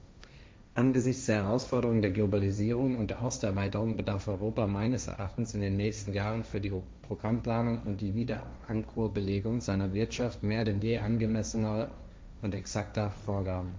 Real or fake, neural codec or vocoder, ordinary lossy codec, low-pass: fake; codec, 16 kHz, 1.1 kbps, Voila-Tokenizer; none; none